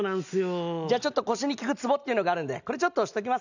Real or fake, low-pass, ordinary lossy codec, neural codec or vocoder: real; 7.2 kHz; none; none